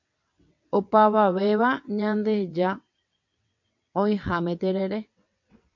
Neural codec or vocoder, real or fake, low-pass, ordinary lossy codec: vocoder, 22.05 kHz, 80 mel bands, WaveNeXt; fake; 7.2 kHz; MP3, 48 kbps